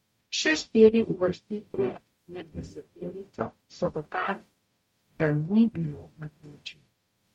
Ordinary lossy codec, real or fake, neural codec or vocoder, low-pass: MP3, 64 kbps; fake; codec, 44.1 kHz, 0.9 kbps, DAC; 19.8 kHz